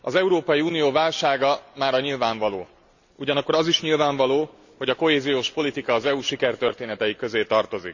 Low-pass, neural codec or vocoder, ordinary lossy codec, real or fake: 7.2 kHz; none; none; real